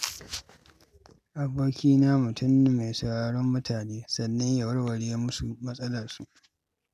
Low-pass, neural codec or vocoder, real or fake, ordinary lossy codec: 14.4 kHz; none; real; none